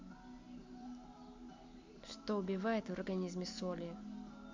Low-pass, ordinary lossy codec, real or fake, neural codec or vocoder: 7.2 kHz; MP3, 64 kbps; real; none